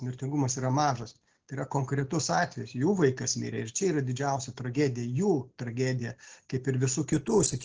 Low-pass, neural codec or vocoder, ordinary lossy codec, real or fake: 7.2 kHz; none; Opus, 16 kbps; real